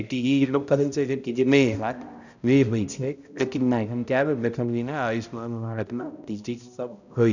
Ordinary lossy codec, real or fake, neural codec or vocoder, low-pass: none; fake; codec, 16 kHz, 0.5 kbps, X-Codec, HuBERT features, trained on balanced general audio; 7.2 kHz